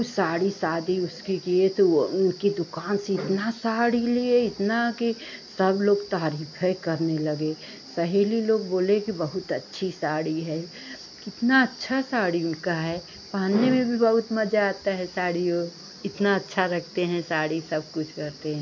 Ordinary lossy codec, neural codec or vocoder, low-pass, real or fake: MP3, 48 kbps; none; 7.2 kHz; real